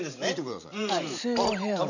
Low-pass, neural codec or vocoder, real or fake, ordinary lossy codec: 7.2 kHz; vocoder, 44.1 kHz, 128 mel bands every 512 samples, BigVGAN v2; fake; none